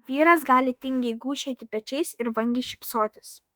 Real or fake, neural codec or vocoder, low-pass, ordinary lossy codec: fake; autoencoder, 48 kHz, 32 numbers a frame, DAC-VAE, trained on Japanese speech; 19.8 kHz; Opus, 64 kbps